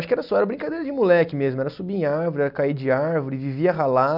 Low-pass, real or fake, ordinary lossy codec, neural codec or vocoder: 5.4 kHz; real; none; none